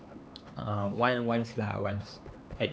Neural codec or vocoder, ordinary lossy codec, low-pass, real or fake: codec, 16 kHz, 4 kbps, X-Codec, HuBERT features, trained on LibriSpeech; none; none; fake